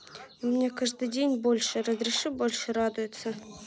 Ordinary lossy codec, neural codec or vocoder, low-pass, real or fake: none; none; none; real